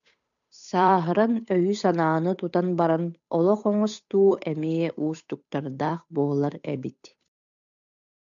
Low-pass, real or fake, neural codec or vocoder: 7.2 kHz; fake; codec, 16 kHz, 8 kbps, FunCodec, trained on Chinese and English, 25 frames a second